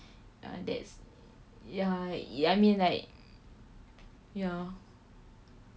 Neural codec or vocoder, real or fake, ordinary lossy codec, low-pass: none; real; none; none